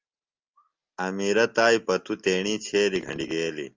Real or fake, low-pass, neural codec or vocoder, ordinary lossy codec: real; 7.2 kHz; none; Opus, 32 kbps